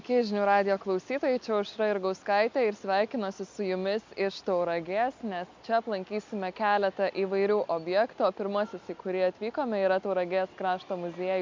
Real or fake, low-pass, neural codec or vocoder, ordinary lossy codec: real; 7.2 kHz; none; MP3, 64 kbps